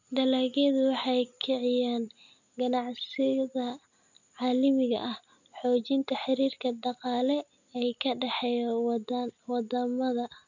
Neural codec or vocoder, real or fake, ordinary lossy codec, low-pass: none; real; none; 7.2 kHz